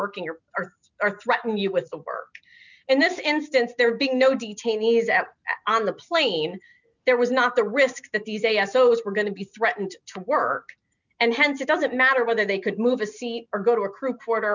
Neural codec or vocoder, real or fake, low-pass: none; real; 7.2 kHz